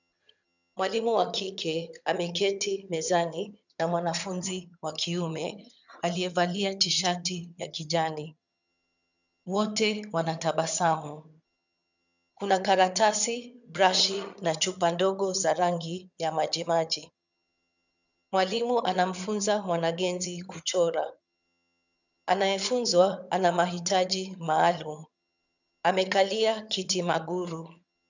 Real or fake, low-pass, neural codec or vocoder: fake; 7.2 kHz; vocoder, 22.05 kHz, 80 mel bands, HiFi-GAN